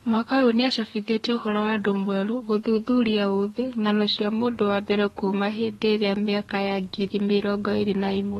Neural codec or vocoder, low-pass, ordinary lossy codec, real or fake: codec, 32 kHz, 1.9 kbps, SNAC; 14.4 kHz; AAC, 32 kbps; fake